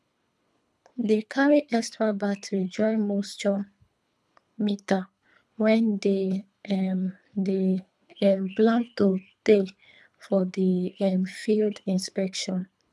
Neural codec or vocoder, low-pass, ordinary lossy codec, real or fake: codec, 24 kHz, 3 kbps, HILCodec; none; none; fake